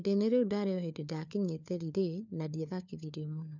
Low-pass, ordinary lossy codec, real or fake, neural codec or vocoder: 7.2 kHz; none; fake; codec, 16 kHz, 4 kbps, FunCodec, trained on LibriTTS, 50 frames a second